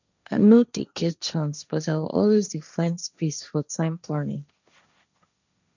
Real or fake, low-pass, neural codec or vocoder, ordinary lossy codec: fake; 7.2 kHz; codec, 16 kHz, 1.1 kbps, Voila-Tokenizer; none